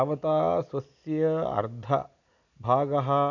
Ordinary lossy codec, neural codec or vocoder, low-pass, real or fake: none; none; 7.2 kHz; real